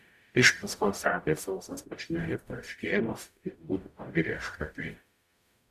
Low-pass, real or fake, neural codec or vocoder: 14.4 kHz; fake; codec, 44.1 kHz, 0.9 kbps, DAC